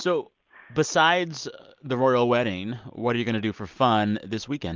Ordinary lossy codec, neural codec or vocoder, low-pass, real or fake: Opus, 24 kbps; none; 7.2 kHz; real